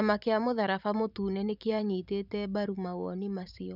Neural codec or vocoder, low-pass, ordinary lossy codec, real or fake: vocoder, 44.1 kHz, 128 mel bands every 512 samples, BigVGAN v2; 5.4 kHz; none; fake